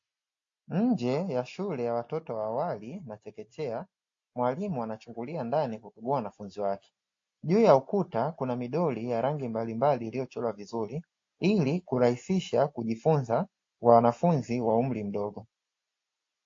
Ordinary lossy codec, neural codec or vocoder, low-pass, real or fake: AAC, 48 kbps; none; 7.2 kHz; real